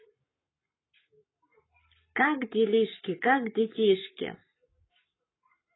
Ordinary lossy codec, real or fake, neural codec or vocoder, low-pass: AAC, 16 kbps; fake; codec, 44.1 kHz, 7.8 kbps, Pupu-Codec; 7.2 kHz